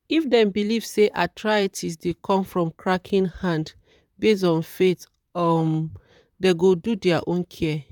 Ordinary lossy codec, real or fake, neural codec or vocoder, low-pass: none; fake; vocoder, 44.1 kHz, 128 mel bands, Pupu-Vocoder; 19.8 kHz